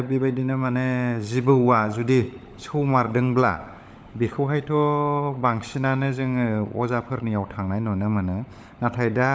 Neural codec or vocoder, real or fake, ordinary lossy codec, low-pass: codec, 16 kHz, 16 kbps, FunCodec, trained on LibriTTS, 50 frames a second; fake; none; none